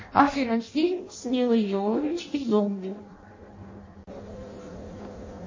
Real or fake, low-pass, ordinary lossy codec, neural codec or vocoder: fake; 7.2 kHz; MP3, 32 kbps; codec, 16 kHz in and 24 kHz out, 0.6 kbps, FireRedTTS-2 codec